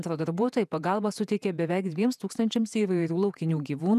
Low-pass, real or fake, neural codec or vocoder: 14.4 kHz; fake; vocoder, 48 kHz, 128 mel bands, Vocos